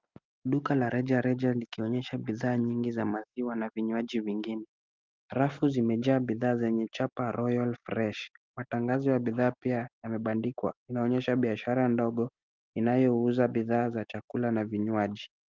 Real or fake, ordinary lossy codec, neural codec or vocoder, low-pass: real; Opus, 32 kbps; none; 7.2 kHz